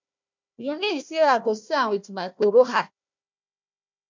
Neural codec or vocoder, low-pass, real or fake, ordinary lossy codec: codec, 16 kHz, 1 kbps, FunCodec, trained on Chinese and English, 50 frames a second; 7.2 kHz; fake; MP3, 64 kbps